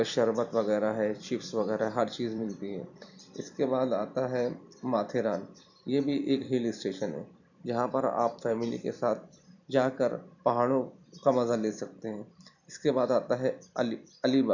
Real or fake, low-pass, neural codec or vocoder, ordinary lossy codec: fake; 7.2 kHz; vocoder, 44.1 kHz, 128 mel bands every 256 samples, BigVGAN v2; none